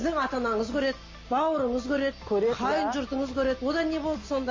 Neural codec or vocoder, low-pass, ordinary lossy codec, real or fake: none; 7.2 kHz; MP3, 32 kbps; real